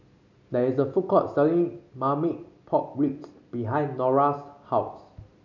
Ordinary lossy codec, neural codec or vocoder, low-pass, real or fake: none; none; 7.2 kHz; real